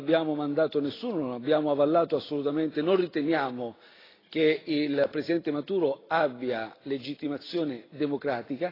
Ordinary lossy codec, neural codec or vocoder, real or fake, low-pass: AAC, 24 kbps; none; real; 5.4 kHz